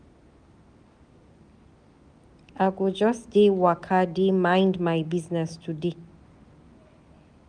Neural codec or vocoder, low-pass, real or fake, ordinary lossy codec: none; 9.9 kHz; real; none